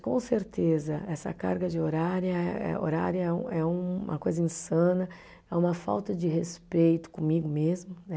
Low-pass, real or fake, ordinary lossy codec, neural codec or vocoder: none; real; none; none